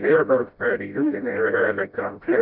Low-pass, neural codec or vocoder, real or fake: 5.4 kHz; codec, 16 kHz, 0.5 kbps, FreqCodec, smaller model; fake